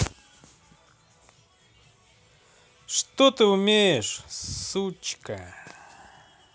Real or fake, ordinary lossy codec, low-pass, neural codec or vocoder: real; none; none; none